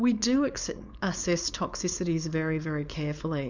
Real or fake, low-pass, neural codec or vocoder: fake; 7.2 kHz; codec, 16 kHz, 4.8 kbps, FACodec